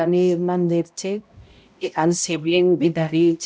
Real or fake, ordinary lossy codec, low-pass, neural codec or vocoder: fake; none; none; codec, 16 kHz, 0.5 kbps, X-Codec, HuBERT features, trained on balanced general audio